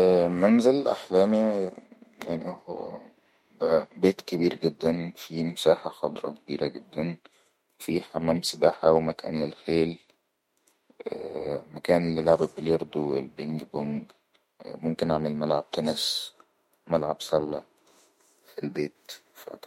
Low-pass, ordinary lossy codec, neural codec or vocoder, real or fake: 19.8 kHz; MP3, 64 kbps; autoencoder, 48 kHz, 32 numbers a frame, DAC-VAE, trained on Japanese speech; fake